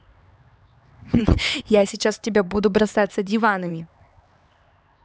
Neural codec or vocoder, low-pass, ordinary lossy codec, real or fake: codec, 16 kHz, 4 kbps, X-Codec, HuBERT features, trained on LibriSpeech; none; none; fake